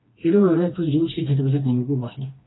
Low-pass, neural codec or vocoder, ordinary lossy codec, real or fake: 7.2 kHz; codec, 16 kHz, 2 kbps, FreqCodec, smaller model; AAC, 16 kbps; fake